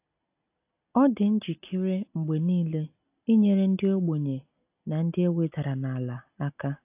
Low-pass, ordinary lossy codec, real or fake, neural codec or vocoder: 3.6 kHz; AAC, 32 kbps; real; none